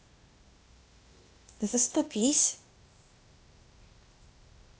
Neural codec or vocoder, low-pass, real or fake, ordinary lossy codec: codec, 16 kHz, 0.8 kbps, ZipCodec; none; fake; none